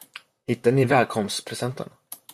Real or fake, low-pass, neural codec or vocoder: fake; 14.4 kHz; vocoder, 44.1 kHz, 128 mel bands, Pupu-Vocoder